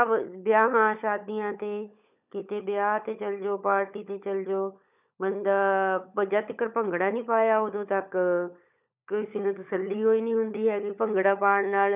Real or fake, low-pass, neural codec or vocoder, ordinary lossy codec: fake; 3.6 kHz; codec, 16 kHz, 16 kbps, FunCodec, trained on LibriTTS, 50 frames a second; none